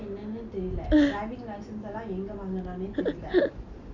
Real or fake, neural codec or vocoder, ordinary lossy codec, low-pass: real; none; none; 7.2 kHz